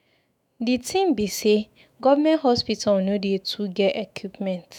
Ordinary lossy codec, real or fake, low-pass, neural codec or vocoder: none; fake; 19.8 kHz; autoencoder, 48 kHz, 128 numbers a frame, DAC-VAE, trained on Japanese speech